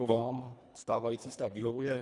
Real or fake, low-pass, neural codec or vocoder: fake; 10.8 kHz; codec, 24 kHz, 1.5 kbps, HILCodec